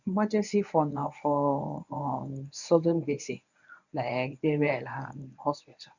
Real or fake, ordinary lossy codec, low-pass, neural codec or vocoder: fake; none; 7.2 kHz; codec, 24 kHz, 0.9 kbps, WavTokenizer, medium speech release version 1